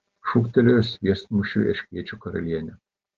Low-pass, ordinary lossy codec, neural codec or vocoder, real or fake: 7.2 kHz; Opus, 16 kbps; none; real